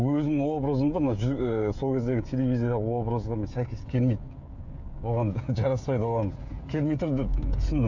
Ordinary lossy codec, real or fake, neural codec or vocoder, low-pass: none; fake; codec, 16 kHz, 16 kbps, FreqCodec, smaller model; 7.2 kHz